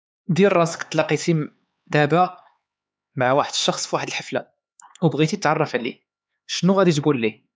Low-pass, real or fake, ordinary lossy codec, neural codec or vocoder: none; fake; none; codec, 16 kHz, 4 kbps, X-Codec, HuBERT features, trained on LibriSpeech